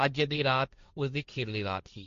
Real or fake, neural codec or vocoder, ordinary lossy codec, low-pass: fake; codec, 16 kHz, 1.1 kbps, Voila-Tokenizer; MP3, 48 kbps; 7.2 kHz